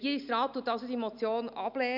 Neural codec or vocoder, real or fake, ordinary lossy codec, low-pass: none; real; none; 5.4 kHz